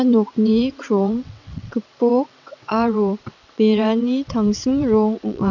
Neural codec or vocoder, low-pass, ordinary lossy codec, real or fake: vocoder, 22.05 kHz, 80 mel bands, WaveNeXt; 7.2 kHz; none; fake